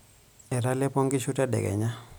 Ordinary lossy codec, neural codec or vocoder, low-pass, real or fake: none; none; none; real